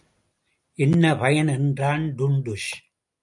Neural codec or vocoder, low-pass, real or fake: none; 10.8 kHz; real